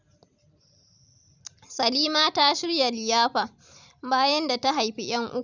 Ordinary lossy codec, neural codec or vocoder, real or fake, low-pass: none; vocoder, 44.1 kHz, 128 mel bands every 256 samples, BigVGAN v2; fake; 7.2 kHz